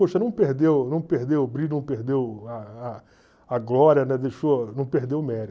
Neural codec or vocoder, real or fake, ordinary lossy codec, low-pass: none; real; none; none